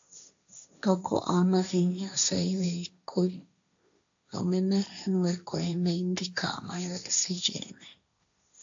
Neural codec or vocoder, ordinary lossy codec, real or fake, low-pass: codec, 16 kHz, 1.1 kbps, Voila-Tokenizer; AAC, 64 kbps; fake; 7.2 kHz